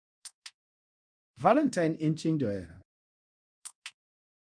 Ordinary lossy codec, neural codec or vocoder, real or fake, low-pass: none; codec, 24 kHz, 0.9 kbps, DualCodec; fake; 9.9 kHz